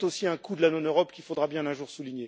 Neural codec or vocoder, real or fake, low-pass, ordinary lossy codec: none; real; none; none